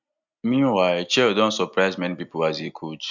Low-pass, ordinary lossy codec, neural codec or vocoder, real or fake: 7.2 kHz; none; none; real